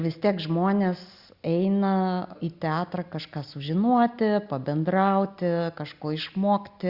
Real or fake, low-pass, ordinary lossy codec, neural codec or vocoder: real; 5.4 kHz; Opus, 64 kbps; none